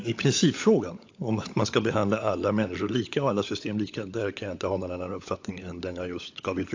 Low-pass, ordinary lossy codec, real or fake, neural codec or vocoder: 7.2 kHz; AAC, 48 kbps; fake; codec, 16 kHz, 16 kbps, FunCodec, trained on LibriTTS, 50 frames a second